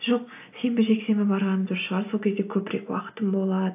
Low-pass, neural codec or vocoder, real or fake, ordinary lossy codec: 3.6 kHz; none; real; AAC, 32 kbps